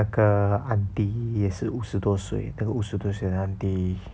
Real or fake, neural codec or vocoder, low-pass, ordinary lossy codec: real; none; none; none